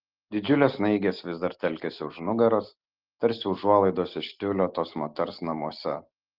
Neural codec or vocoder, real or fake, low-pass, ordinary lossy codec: none; real; 5.4 kHz; Opus, 16 kbps